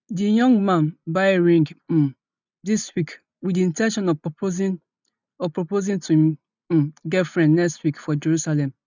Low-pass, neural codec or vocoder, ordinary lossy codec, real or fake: 7.2 kHz; none; none; real